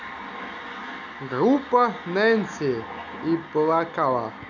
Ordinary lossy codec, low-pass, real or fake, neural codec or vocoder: none; 7.2 kHz; real; none